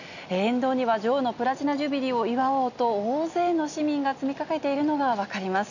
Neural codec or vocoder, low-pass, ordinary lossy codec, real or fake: none; 7.2 kHz; none; real